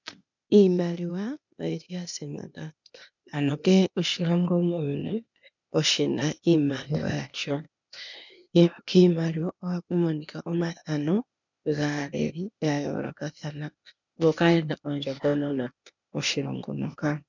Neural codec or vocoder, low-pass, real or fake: codec, 16 kHz, 0.8 kbps, ZipCodec; 7.2 kHz; fake